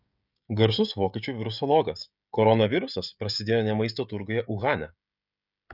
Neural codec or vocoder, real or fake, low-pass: codec, 16 kHz, 16 kbps, FreqCodec, smaller model; fake; 5.4 kHz